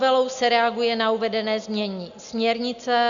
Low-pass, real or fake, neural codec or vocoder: 7.2 kHz; real; none